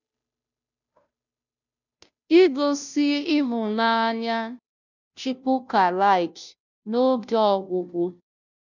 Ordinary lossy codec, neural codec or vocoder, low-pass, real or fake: none; codec, 16 kHz, 0.5 kbps, FunCodec, trained on Chinese and English, 25 frames a second; 7.2 kHz; fake